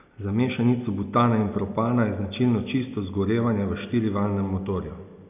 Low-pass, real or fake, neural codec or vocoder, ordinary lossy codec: 3.6 kHz; real; none; none